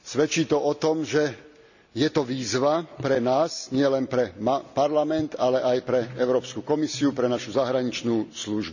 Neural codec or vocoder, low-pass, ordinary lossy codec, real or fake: none; 7.2 kHz; none; real